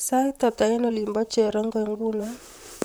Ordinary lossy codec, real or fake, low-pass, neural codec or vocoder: none; fake; none; vocoder, 44.1 kHz, 128 mel bands, Pupu-Vocoder